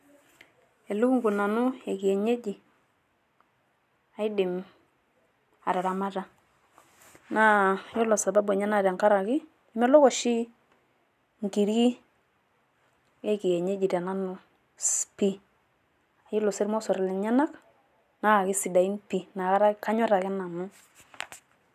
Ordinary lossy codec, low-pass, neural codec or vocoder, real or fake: none; 14.4 kHz; none; real